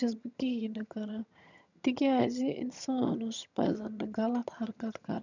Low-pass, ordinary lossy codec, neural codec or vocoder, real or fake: 7.2 kHz; none; vocoder, 22.05 kHz, 80 mel bands, HiFi-GAN; fake